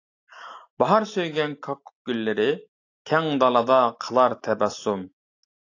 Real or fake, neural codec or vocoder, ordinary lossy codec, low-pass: real; none; AAC, 48 kbps; 7.2 kHz